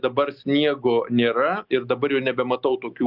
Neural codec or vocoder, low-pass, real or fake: none; 5.4 kHz; real